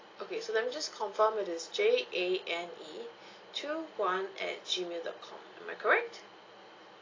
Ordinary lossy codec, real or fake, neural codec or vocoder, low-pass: AAC, 32 kbps; real; none; 7.2 kHz